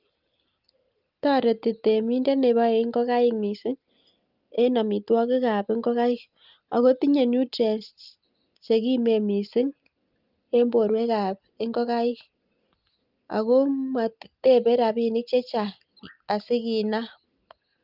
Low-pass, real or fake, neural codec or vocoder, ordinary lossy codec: 5.4 kHz; real; none; Opus, 24 kbps